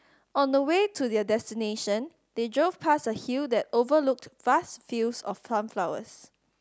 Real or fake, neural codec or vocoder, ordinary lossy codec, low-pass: real; none; none; none